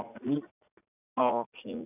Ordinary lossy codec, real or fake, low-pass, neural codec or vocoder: none; fake; 3.6 kHz; vocoder, 44.1 kHz, 80 mel bands, Vocos